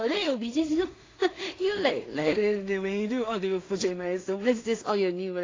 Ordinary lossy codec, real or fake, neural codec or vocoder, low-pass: AAC, 32 kbps; fake; codec, 16 kHz in and 24 kHz out, 0.4 kbps, LongCat-Audio-Codec, two codebook decoder; 7.2 kHz